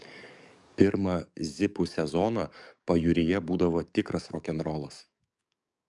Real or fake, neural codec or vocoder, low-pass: fake; codec, 44.1 kHz, 7.8 kbps, DAC; 10.8 kHz